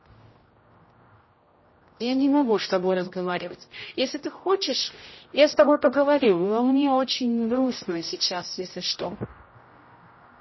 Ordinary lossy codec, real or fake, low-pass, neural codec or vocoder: MP3, 24 kbps; fake; 7.2 kHz; codec, 16 kHz, 0.5 kbps, X-Codec, HuBERT features, trained on general audio